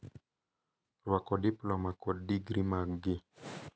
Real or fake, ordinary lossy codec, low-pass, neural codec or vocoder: real; none; none; none